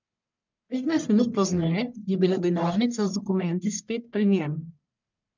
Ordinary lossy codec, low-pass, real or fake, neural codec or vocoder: none; 7.2 kHz; fake; codec, 44.1 kHz, 1.7 kbps, Pupu-Codec